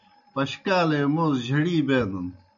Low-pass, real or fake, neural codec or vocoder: 7.2 kHz; real; none